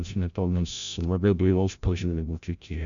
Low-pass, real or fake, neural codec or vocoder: 7.2 kHz; fake; codec, 16 kHz, 0.5 kbps, FreqCodec, larger model